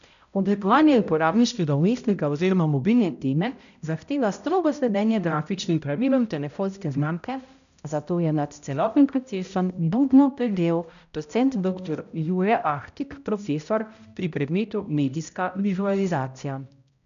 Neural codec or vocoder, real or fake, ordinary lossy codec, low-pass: codec, 16 kHz, 0.5 kbps, X-Codec, HuBERT features, trained on balanced general audio; fake; none; 7.2 kHz